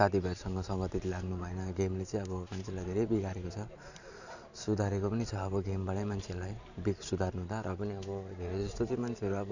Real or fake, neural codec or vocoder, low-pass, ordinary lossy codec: fake; vocoder, 44.1 kHz, 128 mel bands every 512 samples, BigVGAN v2; 7.2 kHz; none